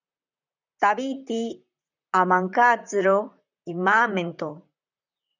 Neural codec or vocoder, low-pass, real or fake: vocoder, 44.1 kHz, 128 mel bands, Pupu-Vocoder; 7.2 kHz; fake